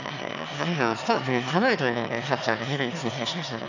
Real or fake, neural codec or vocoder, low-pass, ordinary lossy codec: fake; autoencoder, 22.05 kHz, a latent of 192 numbers a frame, VITS, trained on one speaker; 7.2 kHz; none